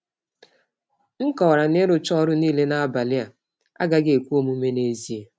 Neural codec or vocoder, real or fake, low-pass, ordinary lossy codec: none; real; none; none